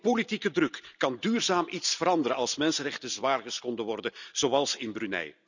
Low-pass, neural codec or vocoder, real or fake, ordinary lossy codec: 7.2 kHz; none; real; none